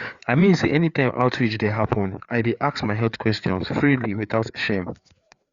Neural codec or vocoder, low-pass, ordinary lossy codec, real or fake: codec, 16 kHz, 4 kbps, FreqCodec, larger model; 7.2 kHz; Opus, 64 kbps; fake